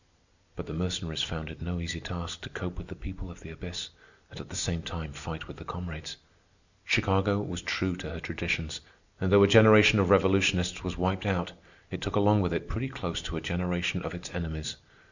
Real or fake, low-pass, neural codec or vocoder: real; 7.2 kHz; none